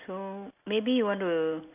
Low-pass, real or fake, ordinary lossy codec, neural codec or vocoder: 3.6 kHz; real; none; none